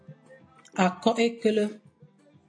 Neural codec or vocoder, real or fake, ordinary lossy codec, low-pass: none; real; AAC, 48 kbps; 9.9 kHz